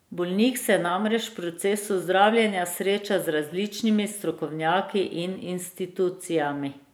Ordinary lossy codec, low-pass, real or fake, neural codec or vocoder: none; none; fake; vocoder, 44.1 kHz, 128 mel bands every 512 samples, BigVGAN v2